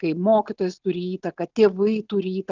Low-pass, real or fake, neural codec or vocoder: 7.2 kHz; real; none